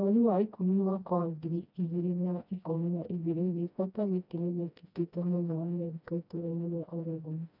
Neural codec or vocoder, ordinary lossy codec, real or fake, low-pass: codec, 16 kHz, 1 kbps, FreqCodec, smaller model; none; fake; 5.4 kHz